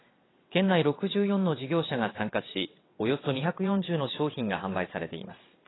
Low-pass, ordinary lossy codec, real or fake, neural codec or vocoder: 7.2 kHz; AAC, 16 kbps; fake; vocoder, 22.05 kHz, 80 mel bands, WaveNeXt